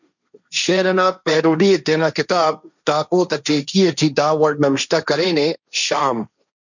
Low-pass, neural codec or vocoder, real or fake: 7.2 kHz; codec, 16 kHz, 1.1 kbps, Voila-Tokenizer; fake